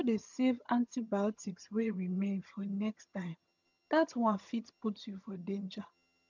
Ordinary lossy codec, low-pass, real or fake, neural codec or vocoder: none; 7.2 kHz; fake; vocoder, 22.05 kHz, 80 mel bands, HiFi-GAN